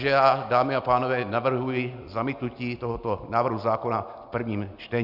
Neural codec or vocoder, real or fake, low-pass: none; real; 5.4 kHz